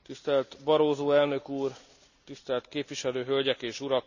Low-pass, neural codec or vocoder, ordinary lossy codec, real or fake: 7.2 kHz; none; none; real